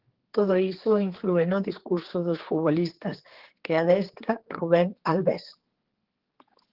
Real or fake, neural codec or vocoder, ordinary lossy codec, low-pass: fake; codec, 16 kHz, 4 kbps, X-Codec, HuBERT features, trained on general audio; Opus, 16 kbps; 5.4 kHz